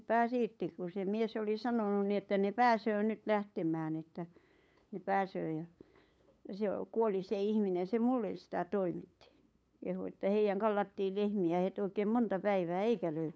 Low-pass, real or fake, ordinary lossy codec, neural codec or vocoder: none; fake; none; codec, 16 kHz, 8 kbps, FunCodec, trained on LibriTTS, 25 frames a second